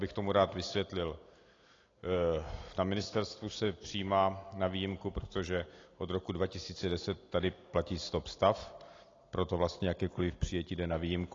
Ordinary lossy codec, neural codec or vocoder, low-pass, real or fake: AAC, 32 kbps; none; 7.2 kHz; real